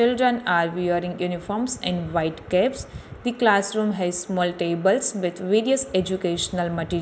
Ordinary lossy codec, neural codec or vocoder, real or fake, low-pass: none; none; real; none